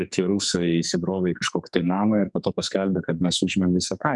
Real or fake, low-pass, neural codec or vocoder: fake; 10.8 kHz; codec, 44.1 kHz, 2.6 kbps, SNAC